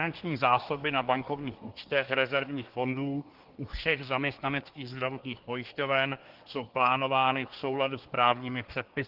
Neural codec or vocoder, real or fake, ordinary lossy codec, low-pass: codec, 24 kHz, 1 kbps, SNAC; fake; Opus, 16 kbps; 5.4 kHz